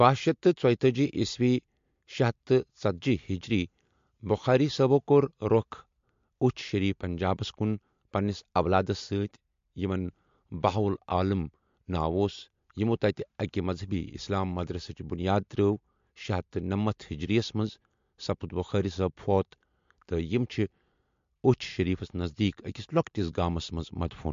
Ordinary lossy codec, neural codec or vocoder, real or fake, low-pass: MP3, 48 kbps; none; real; 7.2 kHz